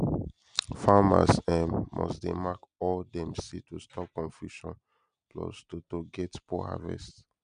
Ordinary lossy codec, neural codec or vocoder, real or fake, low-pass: none; none; real; 9.9 kHz